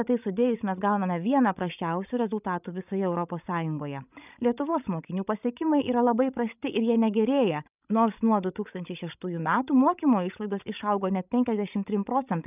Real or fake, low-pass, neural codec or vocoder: fake; 3.6 kHz; codec, 16 kHz, 16 kbps, FreqCodec, larger model